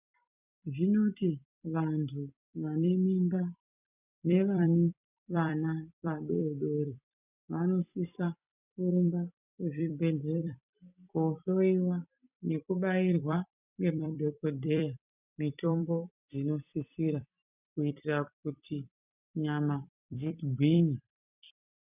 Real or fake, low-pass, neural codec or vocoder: fake; 3.6 kHz; vocoder, 44.1 kHz, 128 mel bands every 256 samples, BigVGAN v2